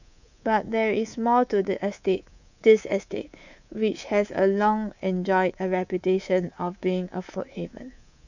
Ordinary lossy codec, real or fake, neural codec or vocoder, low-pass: none; fake; codec, 24 kHz, 3.1 kbps, DualCodec; 7.2 kHz